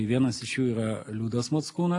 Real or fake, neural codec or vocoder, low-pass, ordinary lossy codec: real; none; 10.8 kHz; AAC, 48 kbps